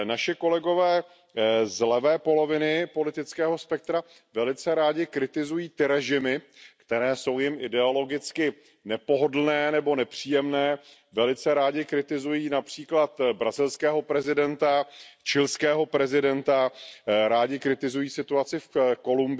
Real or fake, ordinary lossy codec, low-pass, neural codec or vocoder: real; none; none; none